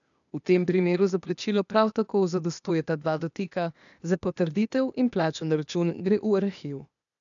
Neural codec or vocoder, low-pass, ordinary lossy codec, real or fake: codec, 16 kHz, 0.8 kbps, ZipCodec; 7.2 kHz; none; fake